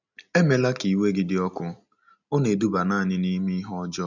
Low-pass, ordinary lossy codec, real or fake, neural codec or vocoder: 7.2 kHz; none; real; none